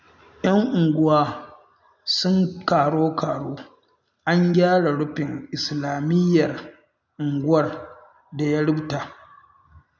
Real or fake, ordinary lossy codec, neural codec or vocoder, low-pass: real; none; none; 7.2 kHz